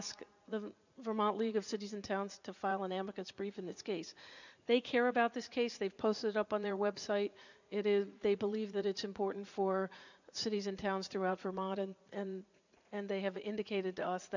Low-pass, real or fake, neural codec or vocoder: 7.2 kHz; fake; vocoder, 44.1 kHz, 80 mel bands, Vocos